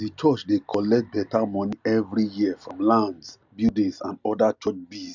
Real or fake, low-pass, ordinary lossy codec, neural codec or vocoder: real; 7.2 kHz; none; none